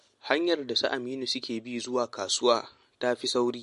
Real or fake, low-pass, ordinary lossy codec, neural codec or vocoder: real; 14.4 kHz; MP3, 48 kbps; none